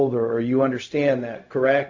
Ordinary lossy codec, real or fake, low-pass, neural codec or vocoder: AAC, 48 kbps; fake; 7.2 kHz; codec, 16 kHz, 0.4 kbps, LongCat-Audio-Codec